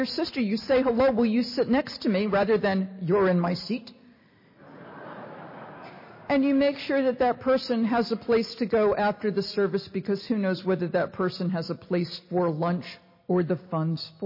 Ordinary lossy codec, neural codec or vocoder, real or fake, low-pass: MP3, 24 kbps; none; real; 5.4 kHz